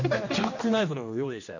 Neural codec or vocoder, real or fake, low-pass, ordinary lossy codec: codec, 16 kHz, 1 kbps, X-Codec, HuBERT features, trained on general audio; fake; 7.2 kHz; none